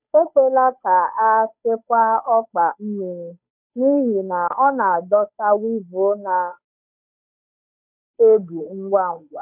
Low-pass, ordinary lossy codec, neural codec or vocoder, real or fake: 3.6 kHz; none; codec, 16 kHz, 8 kbps, FunCodec, trained on Chinese and English, 25 frames a second; fake